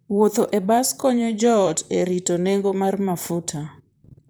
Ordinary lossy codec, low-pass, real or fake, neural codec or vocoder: none; none; fake; vocoder, 44.1 kHz, 128 mel bands, Pupu-Vocoder